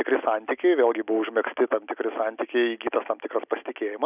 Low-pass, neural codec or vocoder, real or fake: 3.6 kHz; none; real